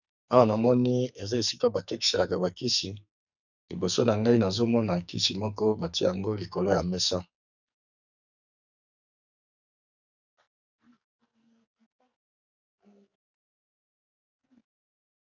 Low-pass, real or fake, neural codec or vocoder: 7.2 kHz; fake; codec, 44.1 kHz, 2.6 kbps, SNAC